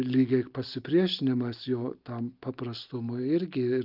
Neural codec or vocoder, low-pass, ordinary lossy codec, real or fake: none; 5.4 kHz; Opus, 32 kbps; real